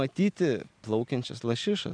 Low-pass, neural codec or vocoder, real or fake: 9.9 kHz; none; real